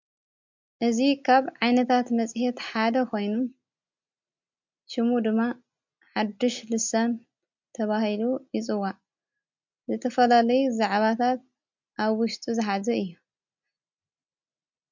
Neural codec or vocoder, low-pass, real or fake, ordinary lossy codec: none; 7.2 kHz; real; MP3, 64 kbps